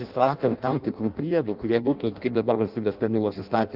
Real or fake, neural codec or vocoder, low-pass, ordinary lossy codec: fake; codec, 16 kHz in and 24 kHz out, 0.6 kbps, FireRedTTS-2 codec; 5.4 kHz; Opus, 24 kbps